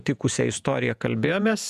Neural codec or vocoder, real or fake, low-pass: vocoder, 48 kHz, 128 mel bands, Vocos; fake; 14.4 kHz